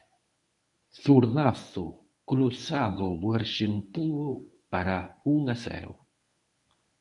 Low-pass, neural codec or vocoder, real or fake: 10.8 kHz; codec, 24 kHz, 0.9 kbps, WavTokenizer, medium speech release version 1; fake